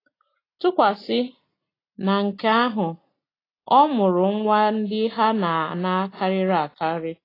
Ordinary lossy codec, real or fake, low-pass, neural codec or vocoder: AAC, 24 kbps; real; 5.4 kHz; none